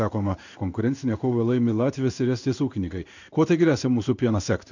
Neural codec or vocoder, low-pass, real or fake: codec, 16 kHz in and 24 kHz out, 1 kbps, XY-Tokenizer; 7.2 kHz; fake